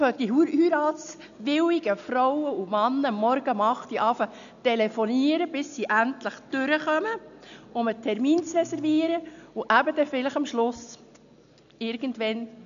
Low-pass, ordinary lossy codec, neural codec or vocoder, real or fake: 7.2 kHz; none; none; real